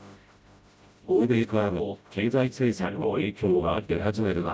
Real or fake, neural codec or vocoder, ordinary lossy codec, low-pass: fake; codec, 16 kHz, 0.5 kbps, FreqCodec, smaller model; none; none